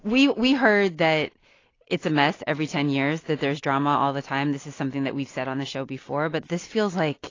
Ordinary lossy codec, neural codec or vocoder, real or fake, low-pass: AAC, 32 kbps; none; real; 7.2 kHz